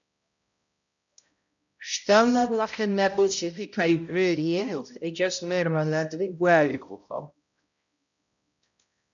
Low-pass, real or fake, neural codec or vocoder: 7.2 kHz; fake; codec, 16 kHz, 0.5 kbps, X-Codec, HuBERT features, trained on balanced general audio